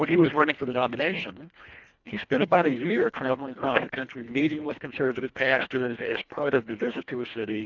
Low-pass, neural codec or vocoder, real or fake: 7.2 kHz; codec, 24 kHz, 1.5 kbps, HILCodec; fake